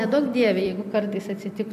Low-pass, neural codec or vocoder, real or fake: 14.4 kHz; vocoder, 44.1 kHz, 128 mel bands every 256 samples, BigVGAN v2; fake